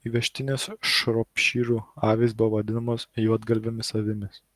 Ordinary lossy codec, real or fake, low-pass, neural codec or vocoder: Opus, 24 kbps; real; 14.4 kHz; none